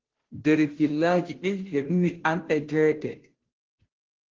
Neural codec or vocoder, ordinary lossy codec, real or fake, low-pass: codec, 16 kHz, 0.5 kbps, FunCodec, trained on Chinese and English, 25 frames a second; Opus, 16 kbps; fake; 7.2 kHz